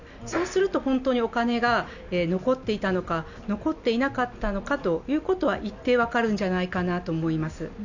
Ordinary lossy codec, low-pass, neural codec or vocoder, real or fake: none; 7.2 kHz; none; real